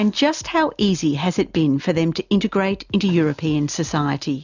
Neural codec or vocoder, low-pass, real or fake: none; 7.2 kHz; real